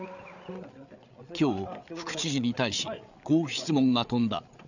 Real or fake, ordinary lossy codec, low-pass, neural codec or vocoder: fake; none; 7.2 kHz; codec, 16 kHz, 8 kbps, FreqCodec, larger model